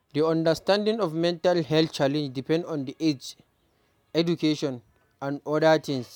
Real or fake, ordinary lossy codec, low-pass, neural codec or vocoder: real; none; 19.8 kHz; none